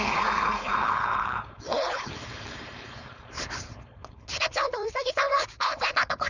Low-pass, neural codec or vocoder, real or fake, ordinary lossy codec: 7.2 kHz; codec, 16 kHz, 4.8 kbps, FACodec; fake; none